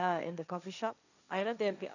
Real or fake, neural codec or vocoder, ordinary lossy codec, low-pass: fake; codec, 16 kHz, 1.1 kbps, Voila-Tokenizer; none; 7.2 kHz